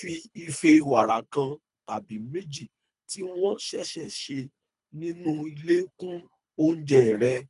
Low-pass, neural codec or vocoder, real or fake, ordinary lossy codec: 10.8 kHz; codec, 24 kHz, 3 kbps, HILCodec; fake; none